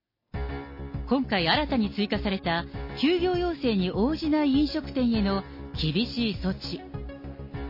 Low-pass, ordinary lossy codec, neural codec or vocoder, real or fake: 5.4 kHz; MP3, 24 kbps; none; real